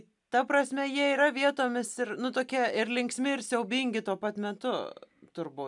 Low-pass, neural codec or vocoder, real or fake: 10.8 kHz; none; real